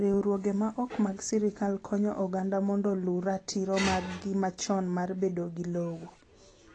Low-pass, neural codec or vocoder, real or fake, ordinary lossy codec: 10.8 kHz; none; real; AAC, 32 kbps